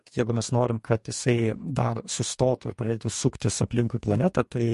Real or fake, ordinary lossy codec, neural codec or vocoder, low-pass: fake; MP3, 48 kbps; codec, 44.1 kHz, 2.6 kbps, DAC; 14.4 kHz